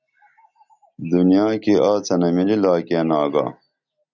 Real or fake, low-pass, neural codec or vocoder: real; 7.2 kHz; none